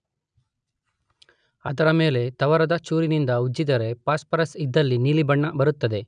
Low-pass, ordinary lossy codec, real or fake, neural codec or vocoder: 9.9 kHz; none; real; none